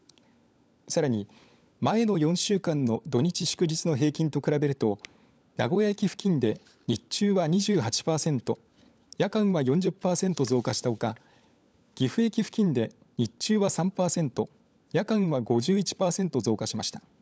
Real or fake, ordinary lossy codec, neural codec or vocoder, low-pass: fake; none; codec, 16 kHz, 16 kbps, FunCodec, trained on LibriTTS, 50 frames a second; none